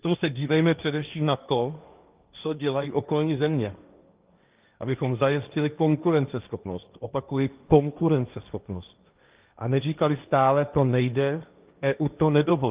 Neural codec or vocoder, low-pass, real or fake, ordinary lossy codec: codec, 16 kHz, 1.1 kbps, Voila-Tokenizer; 3.6 kHz; fake; Opus, 24 kbps